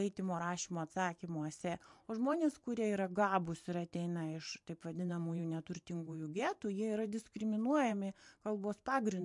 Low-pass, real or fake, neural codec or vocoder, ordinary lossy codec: 10.8 kHz; fake; vocoder, 44.1 kHz, 128 mel bands every 512 samples, BigVGAN v2; MP3, 64 kbps